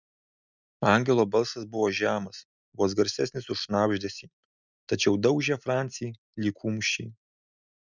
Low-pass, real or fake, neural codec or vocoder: 7.2 kHz; real; none